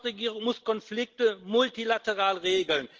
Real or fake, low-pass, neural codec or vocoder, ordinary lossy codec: real; 7.2 kHz; none; Opus, 16 kbps